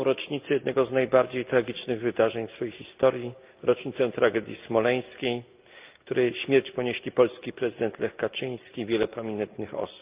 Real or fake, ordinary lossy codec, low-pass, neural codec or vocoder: real; Opus, 24 kbps; 3.6 kHz; none